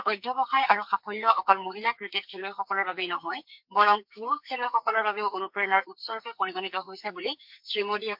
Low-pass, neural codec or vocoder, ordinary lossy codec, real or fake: 5.4 kHz; codec, 44.1 kHz, 2.6 kbps, SNAC; MP3, 48 kbps; fake